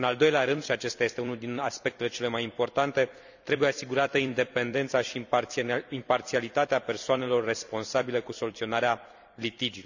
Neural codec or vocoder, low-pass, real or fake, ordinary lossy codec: vocoder, 44.1 kHz, 128 mel bands every 256 samples, BigVGAN v2; 7.2 kHz; fake; none